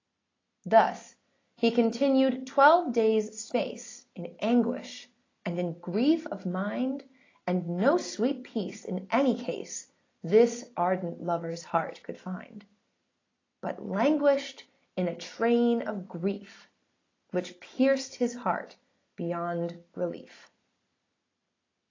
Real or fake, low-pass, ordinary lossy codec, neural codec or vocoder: real; 7.2 kHz; AAC, 32 kbps; none